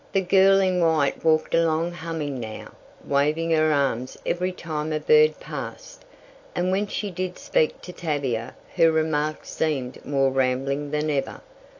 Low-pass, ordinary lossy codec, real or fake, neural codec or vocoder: 7.2 kHz; AAC, 48 kbps; real; none